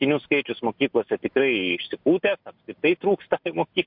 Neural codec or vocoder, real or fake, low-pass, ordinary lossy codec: none; real; 5.4 kHz; MP3, 48 kbps